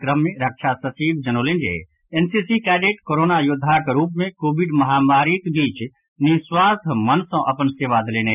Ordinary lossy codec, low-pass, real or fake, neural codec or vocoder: none; 3.6 kHz; real; none